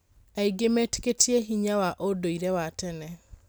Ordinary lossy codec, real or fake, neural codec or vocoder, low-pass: none; real; none; none